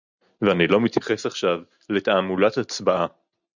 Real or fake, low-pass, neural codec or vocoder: real; 7.2 kHz; none